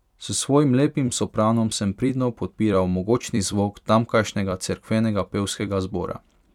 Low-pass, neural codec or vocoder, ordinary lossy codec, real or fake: 19.8 kHz; vocoder, 44.1 kHz, 128 mel bands every 256 samples, BigVGAN v2; none; fake